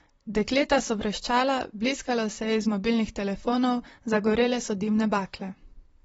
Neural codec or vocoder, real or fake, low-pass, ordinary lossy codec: vocoder, 44.1 kHz, 128 mel bands, Pupu-Vocoder; fake; 19.8 kHz; AAC, 24 kbps